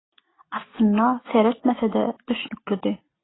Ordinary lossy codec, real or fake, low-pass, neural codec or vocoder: AAC, 16 kbps; real; 7.2 kHz; none